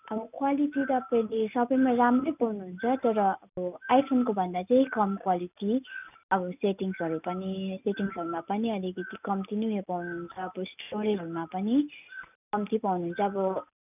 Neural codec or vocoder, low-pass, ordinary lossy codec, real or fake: none; 3.6 kHz; none; real